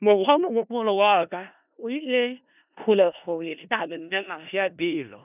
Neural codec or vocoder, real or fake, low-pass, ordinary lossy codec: codec, 16 kHz in and 24 kHz out, 0.4 kbps, LongCat-Audio-Codec, four codebook decoder; fake; 3.6 kHz; none